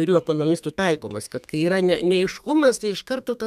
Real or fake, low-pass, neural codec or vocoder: fake; 14.4 kHz; codec, 32 kHz, 1.9 kbps, SNAC